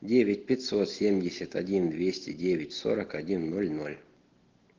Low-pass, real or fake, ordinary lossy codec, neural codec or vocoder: 7.2 kHz; real; Opus, 16 kbps; none